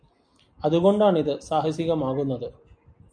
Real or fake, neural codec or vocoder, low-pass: real; none; 9.9 kHz